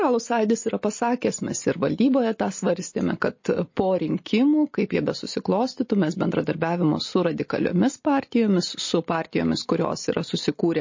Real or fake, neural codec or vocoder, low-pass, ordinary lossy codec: real; none; 7.2 kHz; MP3, 32 kbps